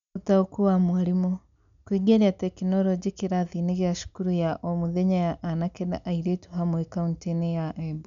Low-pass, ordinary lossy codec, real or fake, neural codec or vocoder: 7.2 kHz; none; real; none